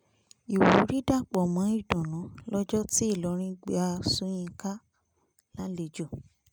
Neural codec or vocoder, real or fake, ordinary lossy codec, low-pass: none; real; none; none